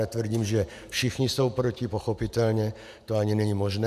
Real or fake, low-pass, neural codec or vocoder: fake; 14.4 kHz; vocoder, 48 kHz, 128 mel bands, Vocos